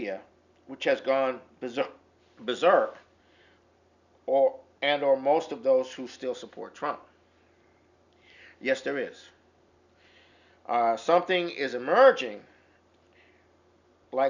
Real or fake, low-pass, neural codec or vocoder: real; 7.2 kHz; none